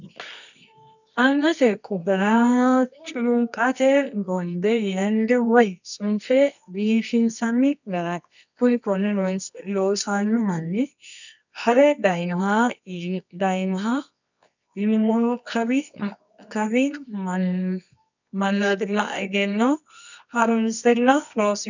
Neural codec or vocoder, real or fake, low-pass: codec, 24 kHz, 0.9 kbps, WavTokenizer, medium music audio release; fake; 7.2 kHz